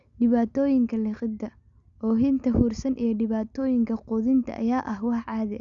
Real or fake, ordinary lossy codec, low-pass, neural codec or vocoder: real; Opus, 64 kbps; 7.2 kHz; none